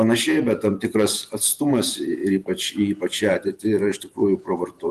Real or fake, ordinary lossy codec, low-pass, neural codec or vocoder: fake; Opus, 32 kbps; 14.4 kHz; vocoder, 48 kHz, 128 mel bands, Vocos